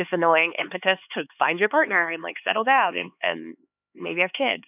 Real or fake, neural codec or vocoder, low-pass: fake; codec, 16 kHz, 4 kbps, X-Codec, HuBERT features, trained on LibriSpeech; 3.6 kHz